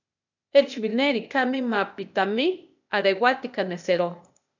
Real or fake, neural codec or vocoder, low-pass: fake; codec, 16 kHz, 0.8 kbps, ZipCodec; 7.2 kHz